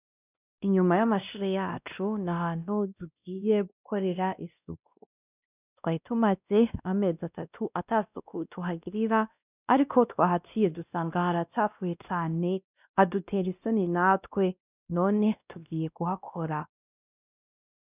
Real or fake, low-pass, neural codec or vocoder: fake; 3.6 kHz; codec, 16 kHz, 1 kbps, X-Codec, WavLM features, trained on Multilingual LibriSpeech